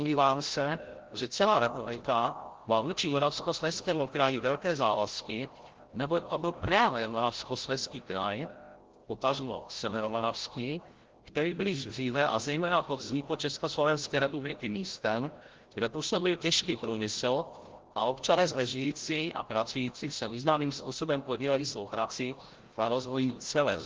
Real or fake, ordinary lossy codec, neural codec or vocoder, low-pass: fake; Opus, 16 kbps; codec, 16 kHz, 0.5 kbps, FreqCodec, larger model; 7.2 kHz